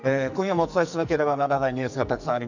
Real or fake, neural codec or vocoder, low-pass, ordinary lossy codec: fake; codec, 44.1 kHz, 2.6 kbps, SNAC; 7.2 kHz; none